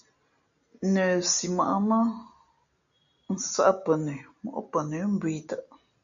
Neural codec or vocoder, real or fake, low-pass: none; real; 7.2 kHz